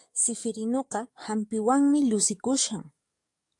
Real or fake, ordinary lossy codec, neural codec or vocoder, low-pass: fake; AAC, 64 kbps; codec, 44.1 kHz, 7.8 kbps, DAC; 10.8 kHz